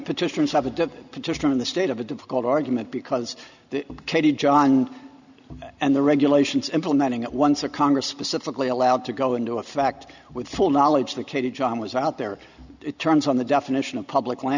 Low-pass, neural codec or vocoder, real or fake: 7.2 kHz; none; real